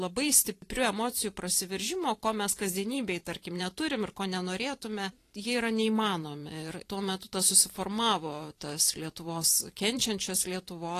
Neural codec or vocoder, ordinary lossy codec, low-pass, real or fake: vocoder, 44.1 kHz, 128 mel bands every 512 samples, BigVGAN v2; AAC, 48 kbps; 14.4 kHz; fake